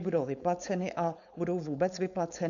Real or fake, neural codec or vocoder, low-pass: fake; codec, 16 kHz, 4.8 kbps, FACodec; 7.2 kHz